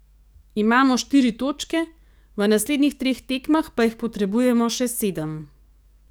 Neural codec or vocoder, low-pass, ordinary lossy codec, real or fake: codec, 44.1 kHz, 7.8 kbps, DAC; none; none; fake